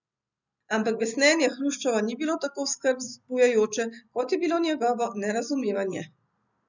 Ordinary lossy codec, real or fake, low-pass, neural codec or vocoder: none; real; 7.2 kHz; none